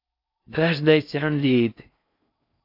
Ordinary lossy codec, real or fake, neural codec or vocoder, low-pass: AAC, 32 kbps; fake; codec, 16 kHz in and 24 kHz out, 0.6 kbps, FocalCodec, streaming, 4096 codes; 5.4 kHz